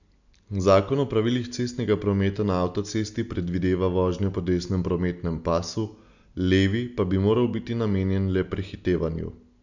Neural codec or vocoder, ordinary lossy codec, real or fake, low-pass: none; none; real; 7.2 kHz